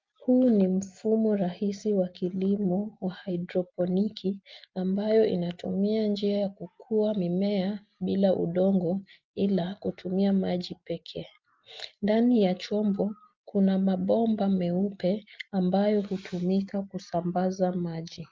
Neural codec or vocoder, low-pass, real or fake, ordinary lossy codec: none; 7.2 kHz; real; Opus, 24 kbps